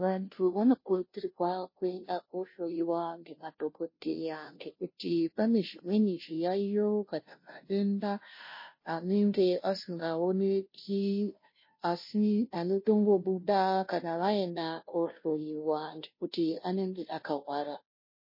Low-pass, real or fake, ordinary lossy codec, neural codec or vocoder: 5.4 kHz; fake; MP3, 24 kbps; codec, 16 kHz, 0.5 kbps, FunCodec, trained on Chinese and English, 25 frames a second